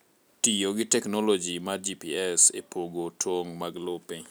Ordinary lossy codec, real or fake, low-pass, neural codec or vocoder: none; real; none; none